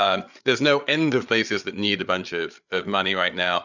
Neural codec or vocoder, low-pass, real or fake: codec, 16 kHz, 8 kbps, FreqCodec, larger model; 7.2 kHz; fake